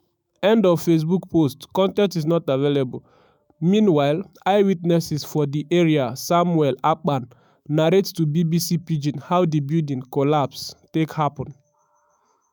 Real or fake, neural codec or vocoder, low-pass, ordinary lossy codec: fake; autoencoder, 48 kHz, 128 numbers a frame, DAC-VAE, trained on Japanese speech; none; none